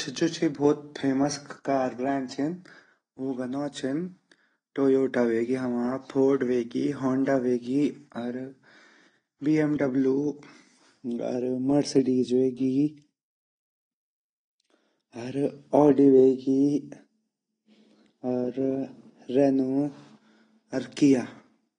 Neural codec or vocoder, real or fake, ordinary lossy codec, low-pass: none; real; AAC, 32 kbps; 9.9 kHz